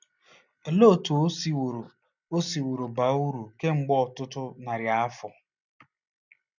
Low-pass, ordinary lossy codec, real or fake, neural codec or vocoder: 7.2 kHz; none; real; none